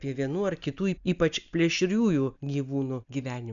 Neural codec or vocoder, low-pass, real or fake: none; 7.2 kHz; real